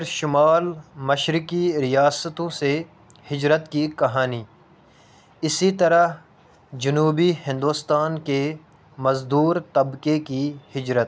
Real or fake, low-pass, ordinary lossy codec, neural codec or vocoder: real; none; none; none